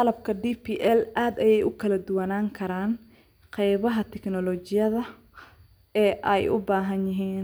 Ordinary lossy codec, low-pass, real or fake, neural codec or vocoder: none; none; real; none